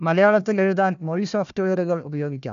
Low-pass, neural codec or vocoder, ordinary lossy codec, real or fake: 7.2 kHz; codec, 16 kHz, 1 kbps, FunCodec, trained on Chinese and English, 50 frames a second; MP3, 96 kbps; fake